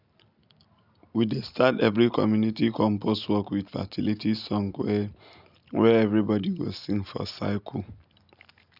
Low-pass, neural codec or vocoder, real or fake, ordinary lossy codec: 5.4 kHz; none; real; none